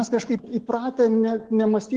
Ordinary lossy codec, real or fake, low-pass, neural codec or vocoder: Opus, 16 kbps; fake; 10.8 kHz; autoencoder, 48 kHz, 128 numbers a frame, DAC-VAE, trained on Japanese speech